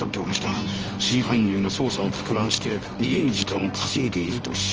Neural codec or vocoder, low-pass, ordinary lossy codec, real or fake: codec, 24 kHz, 0.9 kbps, WavTokenizer, medium music audio release; 7.2 kHz; Opus, 24 kbps; fake